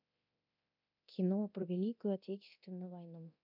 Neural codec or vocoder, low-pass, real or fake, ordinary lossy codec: codec, 24 kHz, 0.9 kbps, DualCodec; 5.4 kHz; fake; none